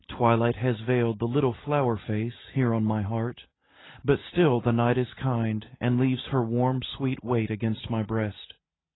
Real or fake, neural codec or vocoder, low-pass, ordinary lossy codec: real; none; 7.2 kHz; AAC, 16 kbps